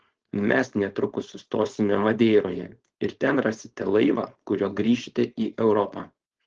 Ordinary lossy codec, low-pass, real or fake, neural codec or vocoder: Opus, 16 kbps; 7.2 kHz; fake; codec, 16 kHz, 4.8 kbps, FACodec